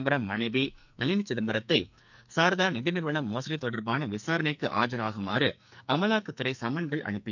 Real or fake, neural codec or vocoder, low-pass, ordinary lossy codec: fake; codec, 44.1 kHz, 2.6 kbps, SNAC; 7.2 kHz; none